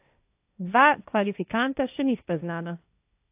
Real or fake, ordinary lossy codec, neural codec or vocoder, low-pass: fake; AAC, 32 kbps; codec, 16 kHz, 1.1 kbps, Voila-Tokenizer; 3.6 kHz